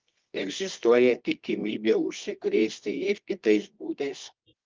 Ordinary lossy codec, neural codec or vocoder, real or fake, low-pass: Opus, 32 kbps; codec, 24 kHz, 0.9 kbps, WavTokenizer, medium music audio release; fake; 7.2 kHz